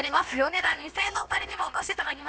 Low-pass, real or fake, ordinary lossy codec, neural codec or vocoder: none; fake; none; codec, 16 kHz, about 1 kbps, DyCAST, with the encoder's durations